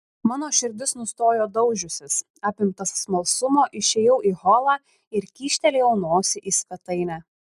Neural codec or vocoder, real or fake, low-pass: none; real; 14.4 kHz